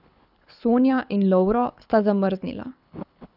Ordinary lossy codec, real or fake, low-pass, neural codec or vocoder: none; fake; 5.4 kHz; codec, 24 kHz, 6 kbps, HILCodec